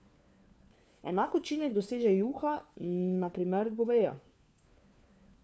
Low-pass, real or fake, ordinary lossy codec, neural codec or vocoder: none; fake; none; codec, 16 kHz, 4 kbps, FunCodec, trained on LibriTTS, 50 frames a second